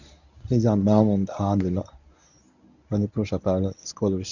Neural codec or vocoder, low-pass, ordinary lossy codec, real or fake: codec, 24 kHz, 0.9 kbps, WavTokenizer, medium speech release version 1; 7.2 kHz; none; fake